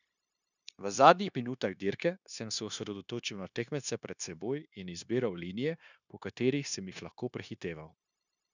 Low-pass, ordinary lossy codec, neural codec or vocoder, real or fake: 7.2 kHz; none; codec, 16 kHz, 0.9 kbps, LongCat-Audio-Codec; fake